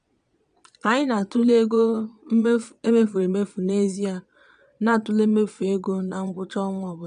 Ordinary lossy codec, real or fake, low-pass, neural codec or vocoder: none; fake; 9.9 kHz; vocoder, 22.05 kHz, 80 mel bands, Vocos